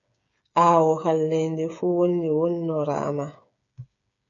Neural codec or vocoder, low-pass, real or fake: codec, 16 kHz, 8 kbps, FreqCodec, smaller model; 7.2 kHz; fake